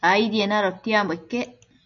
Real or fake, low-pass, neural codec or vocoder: real; 7.2 kHz; none